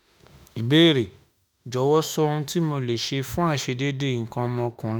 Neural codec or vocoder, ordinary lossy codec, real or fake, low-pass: autoencoder, 48 kHz, 32 numbers a frame, DAC-VAE, trained on Japanese speech; none; fake; none